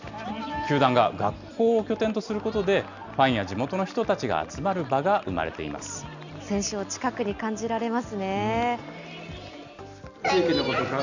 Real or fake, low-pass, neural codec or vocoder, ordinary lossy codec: real; 7.2 kHz; none; none